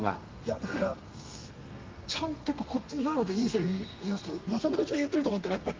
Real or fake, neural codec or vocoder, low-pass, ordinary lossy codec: fake; codec, 32 kHz, 1.9 kbps, SNAC; 7.2 kHz; Opus, 32 kbps